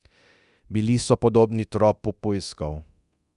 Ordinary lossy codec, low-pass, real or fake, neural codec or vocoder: none; 10.8 kHz; fake; codec, 24 kHz, 0.9 kbps, DualCodec